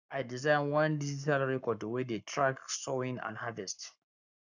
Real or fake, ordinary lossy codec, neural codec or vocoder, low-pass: fake; none; codec, 44.1 kHz, 7.8 kbps, Pupu-Codec; 7.2 kHz